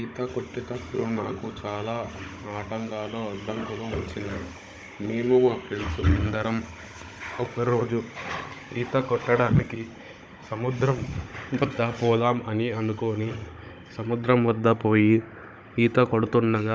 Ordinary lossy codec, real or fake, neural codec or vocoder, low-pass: none; fake; codec, 16 kHz, 16 kbps, FunCodec, trained on Chinese and English, 50 frames a second; none